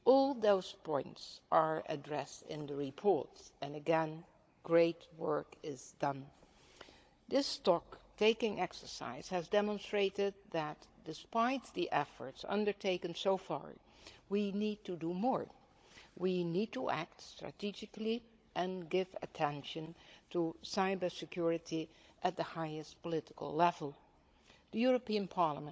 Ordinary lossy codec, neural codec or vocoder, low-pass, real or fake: none; codec, 16 kHz, 16 kbps, FunCodec, trained on Chinese and English, 50 frames a second; none; fake